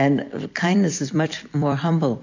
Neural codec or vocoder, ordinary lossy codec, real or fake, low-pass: vocoder, 44.1 kHz, 128 mel bands every 256 samples, BigVGAN v2; MP3, 48 kbps; fake; 7.2 kHz